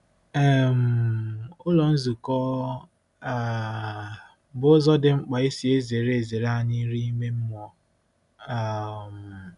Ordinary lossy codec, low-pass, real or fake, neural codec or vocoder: none; 10.8 kHz; real; none